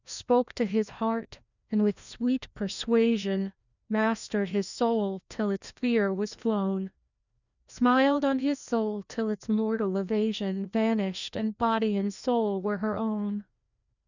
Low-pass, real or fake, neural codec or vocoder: 7.2 kHz; fake; codec, 16 kHz, 1 kbps, FreqCodec, larger model